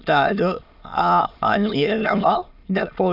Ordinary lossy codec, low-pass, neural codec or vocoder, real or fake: none; 5.4 kHz; autoencoder, 22.05 kHz, a latent of 192 numbers a frame, VITS, trained on many speakers; fake